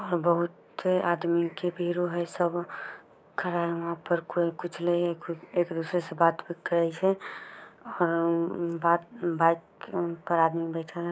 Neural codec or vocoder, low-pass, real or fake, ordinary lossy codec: codec, 16 kHz, 6 kbps, DAC; none; fake; none